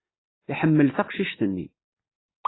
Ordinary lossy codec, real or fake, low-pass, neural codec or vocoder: AAC, 16 kbps; real; 7.2 kHz; none